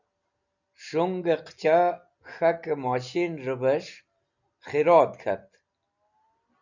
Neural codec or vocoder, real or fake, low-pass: none; real; 7.2 kHz